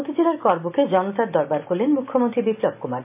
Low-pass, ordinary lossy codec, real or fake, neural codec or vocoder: 3.6 kHz; none; real; none